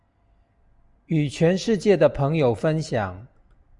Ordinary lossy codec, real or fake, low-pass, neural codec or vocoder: Opus, 64 kbps; real; 10.8 kHz; none